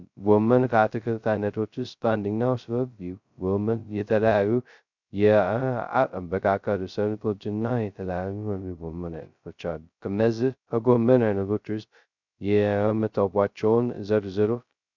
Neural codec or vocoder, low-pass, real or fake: codec, 16 kHz, 0.2 kbps, FocalCodec; 7.2 kHz; fake